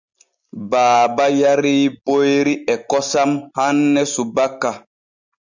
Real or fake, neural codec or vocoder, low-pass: real; none; 7.2 kHz